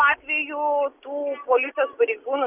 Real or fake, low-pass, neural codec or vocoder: real; 3.6 kHz; none